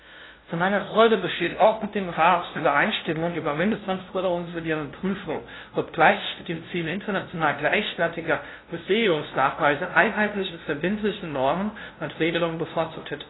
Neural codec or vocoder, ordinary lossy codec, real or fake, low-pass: codec, 16 kHz, 0.5 kbps, FunCodec, trained on LibriTTS, 25 frames a second; AAC, 16 kbps; fake; 7.2 kHz